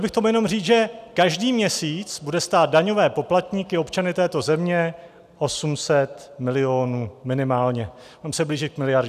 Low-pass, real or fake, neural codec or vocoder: 14.4 kHz; real; none